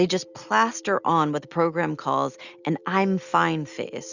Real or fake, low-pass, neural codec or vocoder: real; 7.2 kHz; none